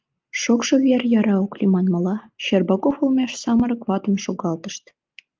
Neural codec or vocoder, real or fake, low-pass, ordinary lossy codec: none; real; 7.2 kHz; Opus, 32 kbps